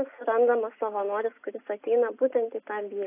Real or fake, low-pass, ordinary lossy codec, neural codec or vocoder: real; 3.6 kHz; AAC, 24 kbps; none